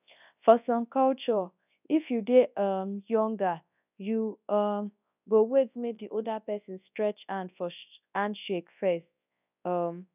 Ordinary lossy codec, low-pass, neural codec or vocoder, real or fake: none; 3.6 kHz; codec, 24 kHz, 0.9 kbps, WavTokenizer, large speech release; fake